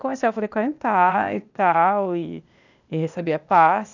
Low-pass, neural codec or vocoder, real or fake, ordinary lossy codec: 7.2 kHz; codec, 16 kHz, 0.8 kbps, ZipCodec; fake; none